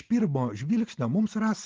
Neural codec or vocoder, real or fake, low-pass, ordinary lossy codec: none; real; 7.2 kHz; Opus, 16 kbps